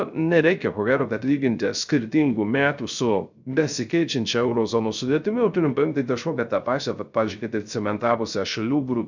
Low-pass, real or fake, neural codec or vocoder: 7.2 kHz; fake; codec, 16 kHz, 0.3 kbps, FocalCodec